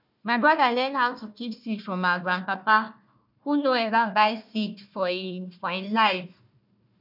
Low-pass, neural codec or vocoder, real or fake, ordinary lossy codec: 5.4 kHz; codec, 16 kHz, 1 kbps, FunCodec, trained on Chinese and English, 50 frames a second; fake; none